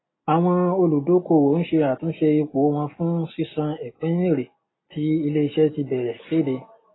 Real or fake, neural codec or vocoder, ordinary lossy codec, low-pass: real; none; AAC, 16 kbps; 7.2 kHz